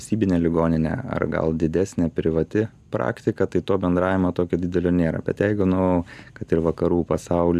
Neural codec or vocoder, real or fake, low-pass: none; real; 14.4 kHz